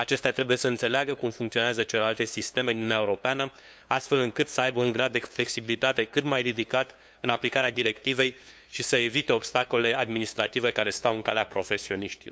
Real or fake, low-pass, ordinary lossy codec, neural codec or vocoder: fake; none; none; codec, 16 kHz, 2 kbps, FunCodec, trained on LibriTTS, 25 frames a second